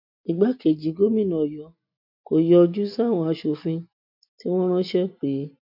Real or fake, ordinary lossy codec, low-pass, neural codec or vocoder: real; MP3, 48 kbps; 5.4 kHz; none